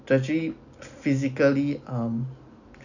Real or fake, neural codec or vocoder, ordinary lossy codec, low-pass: real; none; none; 7.2 kHz